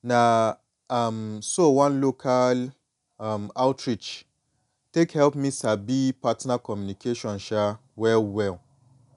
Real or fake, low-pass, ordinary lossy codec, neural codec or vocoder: real; 10.8 kHz; none; none